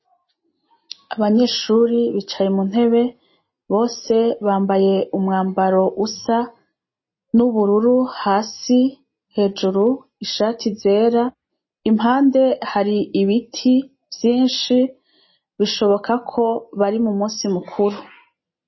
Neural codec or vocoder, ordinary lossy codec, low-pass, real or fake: none; MP3, 24 kbps; 7.2 kHz; real